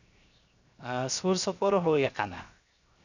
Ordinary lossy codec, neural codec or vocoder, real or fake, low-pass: none; codec, 16 kHz, 0.7 kbps, FocalCodec; fake; 7.2 kHz